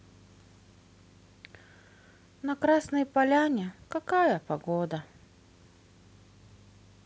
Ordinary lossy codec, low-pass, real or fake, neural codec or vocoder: none; none; real; none